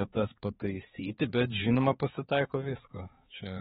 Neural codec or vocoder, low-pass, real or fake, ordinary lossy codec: codec, 16 kHz, 4 kbps, X-Codec, HuBERT features, trained on balanced general audio; 7.2 kHz; fake; AAC, 16 kbps